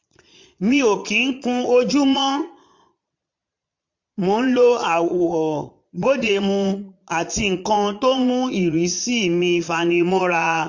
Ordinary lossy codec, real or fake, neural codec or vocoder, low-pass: MP3, 48 kbps; fake; vocoder, 22.05 kHz, 80 mel bands, Vocos; 7.2 kHz